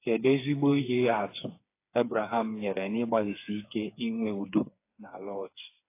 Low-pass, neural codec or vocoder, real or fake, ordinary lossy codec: 3.6 kHz; codec, 16 kHz, 4 kbps, FreqCodec, smaller model; fake; AAC, 24 kbps